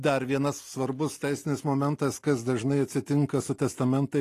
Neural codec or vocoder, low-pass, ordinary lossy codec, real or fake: none; 14.4 kHz; AAC, 48 kbps; real